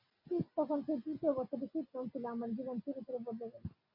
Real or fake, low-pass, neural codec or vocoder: real; 5.4 kHz; none